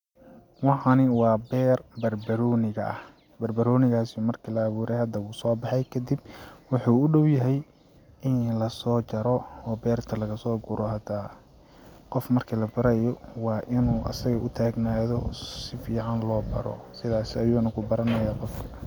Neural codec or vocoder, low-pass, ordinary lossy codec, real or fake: none; 19.8 kHz; none; real